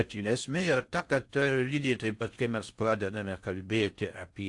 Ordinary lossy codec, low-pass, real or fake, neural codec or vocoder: AAC, 64 kbps; 10.8 kHz; fake; codec, 16 kHz in and 24 kHz out, 0.6 kbps, FocalCodec, streaming, 4096 codes